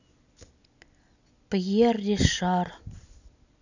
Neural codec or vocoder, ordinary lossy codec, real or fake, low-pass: none; none; real; 7.2 kHz